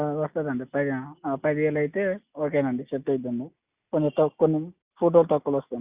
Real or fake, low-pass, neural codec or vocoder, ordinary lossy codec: real; 3.6 kHz; none; Opus, 64 kbps